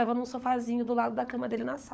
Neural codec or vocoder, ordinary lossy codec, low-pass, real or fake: codec, 16 kHz, 16 kbps, FunCodec, trained on LibriTTS, 50 frames a second; none; none; fake